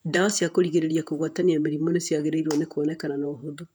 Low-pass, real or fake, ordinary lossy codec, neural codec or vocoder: 19.8 kHz; fake; none; vocoder, 48 kHz, 128 mel bands, Vocos